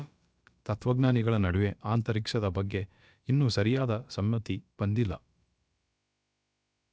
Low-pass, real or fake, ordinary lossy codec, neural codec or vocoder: none; fake; none; codec, 16 kHz, about 1 kbps, DyCAST, with the encoder's durations